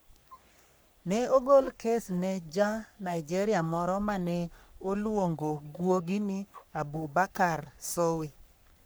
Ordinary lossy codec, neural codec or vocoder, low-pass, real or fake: none; codec, 44.1 kHz, 3.4 kbps, Pupu-Codec; none; fake